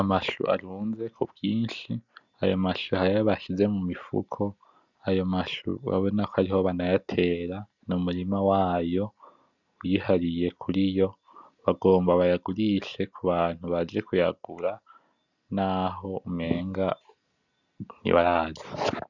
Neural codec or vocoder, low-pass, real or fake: none; 7.2 kHz; real